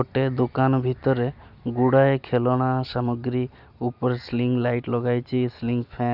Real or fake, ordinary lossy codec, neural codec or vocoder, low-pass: real; none; none; 5.4 kHz